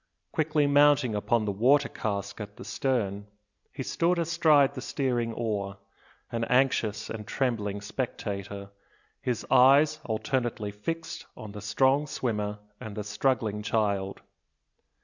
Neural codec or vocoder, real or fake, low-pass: none; real; 7.2 kHz